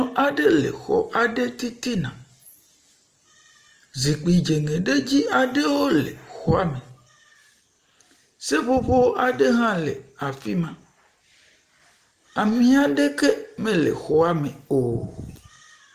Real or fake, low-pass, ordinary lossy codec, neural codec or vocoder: real; 14.4 kHz; Opus, 24 kbps; none